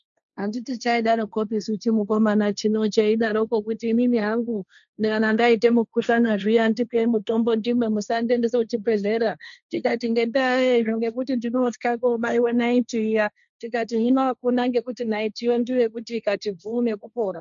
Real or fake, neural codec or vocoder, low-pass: fake; codec, 16 kHz, 1.1 kbps, Voila-Tokenizer; 7.2 kHz